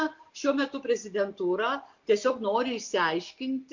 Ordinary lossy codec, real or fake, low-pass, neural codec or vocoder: MP3, 48 kbps; real; 7.2 kHz; none